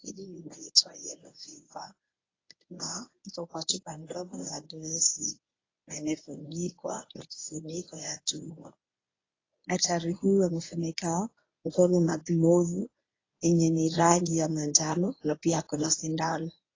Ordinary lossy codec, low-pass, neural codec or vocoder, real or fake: AAC, 32 kbps; 7.2 kHz; codec, 24 kHz, 0.9 kbps, WavTokenizer, medium speech release version 1; fake